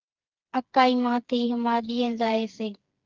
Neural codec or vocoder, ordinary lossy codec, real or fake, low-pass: codec, 16 kHz, 4 kbps, FreqCodec, smaller model; Opus, 32 kbps; fake; 7.2 kHz